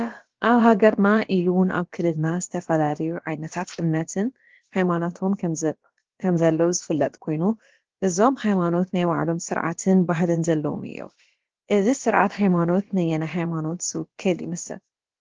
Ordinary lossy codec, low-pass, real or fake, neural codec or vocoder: Opus, 16 kbps; 7.2 kHz; fake; codec, 16 kHz, about 1 kbps, DyCAST, with the encoder's durations